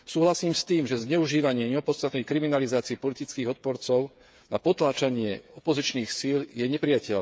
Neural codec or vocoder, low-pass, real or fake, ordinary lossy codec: codec, 16 kHz, 8 kbps, FreqCodec, smaller model; none; fake; none